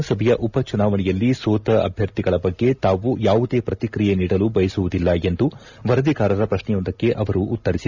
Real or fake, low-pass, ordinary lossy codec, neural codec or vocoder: real; 7.2 kHz; none; none